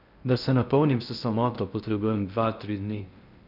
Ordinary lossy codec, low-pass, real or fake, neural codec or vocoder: none; 5.4 kHz; fake; codec, 16 kHz in and 24 kHz out, 0.6 kbps, FocalCodec, streaming, 4096 codes